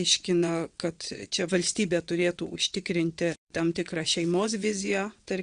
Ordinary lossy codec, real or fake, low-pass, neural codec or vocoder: Opus, 64 kbps; fake; 9.9 kHz; vocoder, 22.05 kHz, 80 mel bands, WaveNeXt